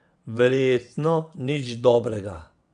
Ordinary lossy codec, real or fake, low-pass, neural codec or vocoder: AAC, 64 kbps; fake; 9.9 kHz; vocoder, 22.05 kHz, 80 mel bands, WaveNeXt